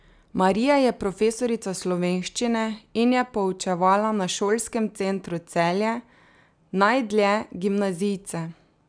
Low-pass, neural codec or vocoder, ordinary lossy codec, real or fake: 9.9 kHz; none; none; real